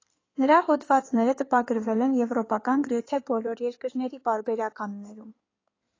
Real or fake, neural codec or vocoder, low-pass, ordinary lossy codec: fake; codec, 16 kHz in and 24 kHz out, 2.2 kbps, FireRedTTS-2 codec; 7.2 kHz; AAC, 32 kbps